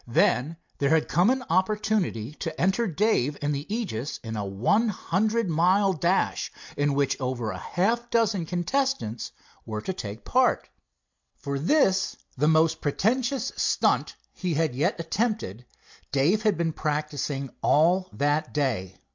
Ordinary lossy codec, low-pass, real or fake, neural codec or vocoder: MP3, 64 kbps; 7.2 kHz; real; none